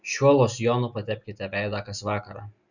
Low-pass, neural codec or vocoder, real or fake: 7.2 kHz; none; real